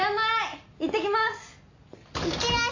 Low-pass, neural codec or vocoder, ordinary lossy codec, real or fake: 7.2 kHz; none; AAC, 48 kbps; real